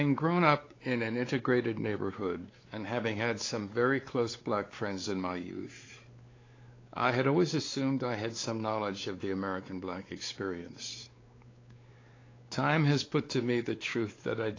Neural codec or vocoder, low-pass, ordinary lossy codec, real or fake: codec, 16 kHz, 4 kbps, X-Codec, WavLM features, trained on Multilingual LibriSpeech; 7.2 kHz; AAC, 32 kbps; fake